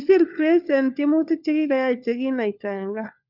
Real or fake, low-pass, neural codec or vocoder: fake; 5.4 kHz; codec, 16 kHz, 4 kbps, FunCodec, trained on LibriTTS, 50 frames a second